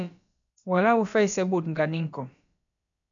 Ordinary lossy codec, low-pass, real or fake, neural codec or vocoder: MP3, 96 kbps; 7.2 kHz; fake; codec, 16 kHz, about 1 kbps, DyCAST, with the encoder's durations